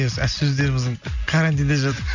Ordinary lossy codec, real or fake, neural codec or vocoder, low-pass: none; real; none; 7.2 kHz